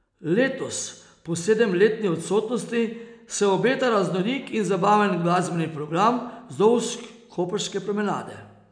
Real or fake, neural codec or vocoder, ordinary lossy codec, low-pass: real; none; none; 9.9 kHz